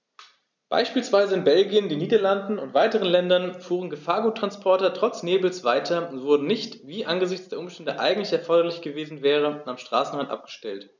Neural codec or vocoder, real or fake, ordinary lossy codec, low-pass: none; real; none; 7.2 kHz